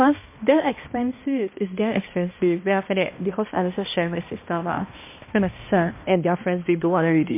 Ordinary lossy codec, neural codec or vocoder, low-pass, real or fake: MP3, 24 kbps; codec, 16 kHz, 1 kbps, X-Codec, HuBERT features, trained on balanced general audio; 3.6 kHz; fake